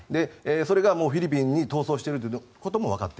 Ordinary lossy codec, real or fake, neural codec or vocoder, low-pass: none; real; none; none